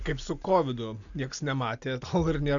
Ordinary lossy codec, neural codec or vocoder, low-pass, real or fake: MP3, 96 kbps; none; 7.2 kHz; real